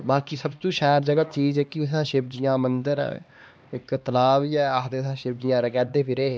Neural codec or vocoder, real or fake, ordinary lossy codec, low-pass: codec, 16 kHz, 2 kbps, X-Codec, HuBERT features, trained on LibriSpeech; fake; none; none